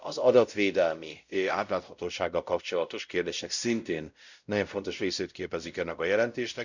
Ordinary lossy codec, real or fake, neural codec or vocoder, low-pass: none; fake; codec, 16 kHz, 0.5 kbps, X-Codec, WavLM features, trained on Multilingual LibriSpeech; 7.2 kHz